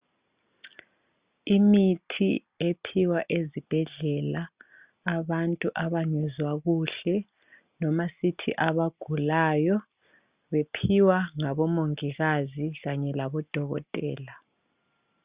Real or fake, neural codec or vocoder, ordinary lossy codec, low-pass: real; none; Opus, 64 kbps; 3.6 kHz